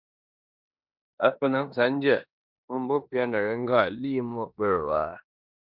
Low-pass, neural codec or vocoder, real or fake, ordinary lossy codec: 5.4 kHz; codec, 16 kHz in and 24 kHz out, 0.9 kbps, LongCat-Audio-Codec, four codebook decoder; fake; MP3, 48 kbps